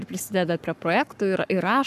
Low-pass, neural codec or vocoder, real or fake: 14.4 kHz; codec, 44.1 kHz, 7.8 kbps, Pupu-Codec; fake